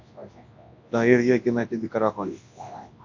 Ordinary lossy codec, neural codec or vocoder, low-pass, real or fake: AAC, 48 kbps; codec, 24 kHz, 0.9 kbps, WavTokenizer, large speech release; 7.2 kHz; fake